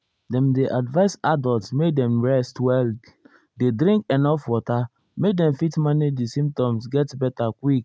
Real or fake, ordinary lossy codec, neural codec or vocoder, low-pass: real; none; none; none